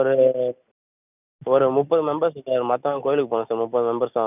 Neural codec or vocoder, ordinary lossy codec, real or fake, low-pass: none; none; real; 3.6 kHz